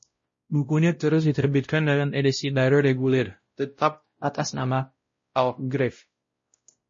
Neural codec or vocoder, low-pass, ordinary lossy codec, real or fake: codec, 16 kHz, 0.5 kbps, X-Codec, WavLM features, trained on Multilingual LibriSpeech; 7.2 kHz; MP3, 32 kbps; fake